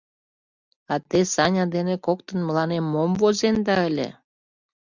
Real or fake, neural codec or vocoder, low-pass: real; none; 7.2 kHz